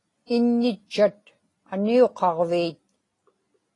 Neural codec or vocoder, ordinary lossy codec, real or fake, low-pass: none; AAC, 32 kbps; real; 10.8 kHz